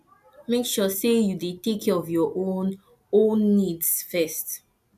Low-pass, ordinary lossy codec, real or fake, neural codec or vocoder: 14.4 kHz; none; real; none